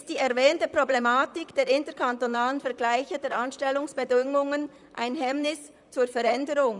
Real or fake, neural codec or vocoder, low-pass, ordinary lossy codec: fake; vocoder, 44.1 kHz, 128 mel bands, Pupu-Vocoder; 10.8 kHz; none